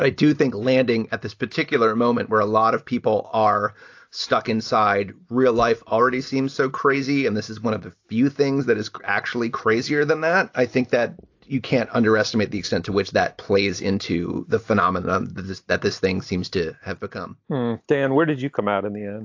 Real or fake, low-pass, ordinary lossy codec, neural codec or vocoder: real; 7.2 kHz; AAC, 48 kbps; none